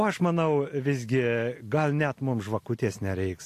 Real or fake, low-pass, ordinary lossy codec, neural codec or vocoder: real; 14.4 kHz; AAC, 64 kbps; none